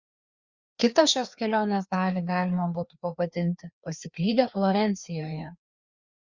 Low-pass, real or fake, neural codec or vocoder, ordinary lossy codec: 7.2 kHz; fake; codec, 16 kHz, 2 kbps, FreqCodec, larger model; Opus, 64 kbps